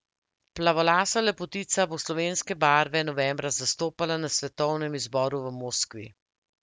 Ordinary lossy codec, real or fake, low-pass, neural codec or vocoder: none; real; none; none